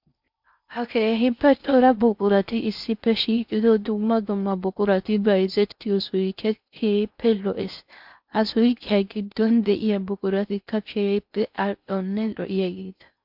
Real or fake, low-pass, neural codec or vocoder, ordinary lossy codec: fake; 5.4 kHz; codec, 16 kHz in and 24 kHz out, 0.6 kbps, FocalCodec, streaming, 4096 codes; MP3, 48 kbps